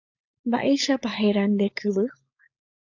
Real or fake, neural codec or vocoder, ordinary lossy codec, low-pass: fake; codec, 16 kHz, 4.8 kbps, FACodec; AAC, 48 kbps; 7.2 kHz